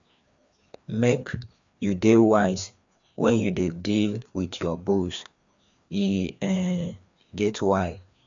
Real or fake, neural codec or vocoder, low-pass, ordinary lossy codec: fake; codec, 16 kHz, 2 kbps, FreqCodec, larger model; 7.2 kHz; MP3, 64 kbps